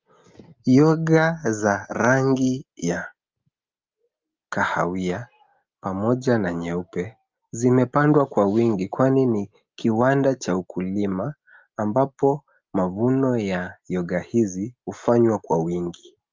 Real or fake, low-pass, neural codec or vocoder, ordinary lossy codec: real; 7.2 kHz; none; Opus, 24 kbps